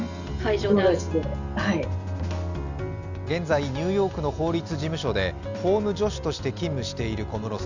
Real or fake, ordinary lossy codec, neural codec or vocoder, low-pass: real; none; none; 7.2 kHz